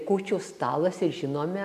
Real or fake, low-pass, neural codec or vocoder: real; 14.4 kHz; none